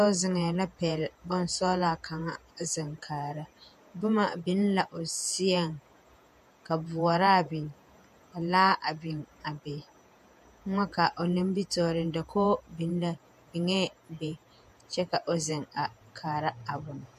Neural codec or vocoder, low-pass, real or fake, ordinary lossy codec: vocoder, 48 kHz, 128 mel bands, Vocos; 14.4 kHz; fake; MP3, 64 kbps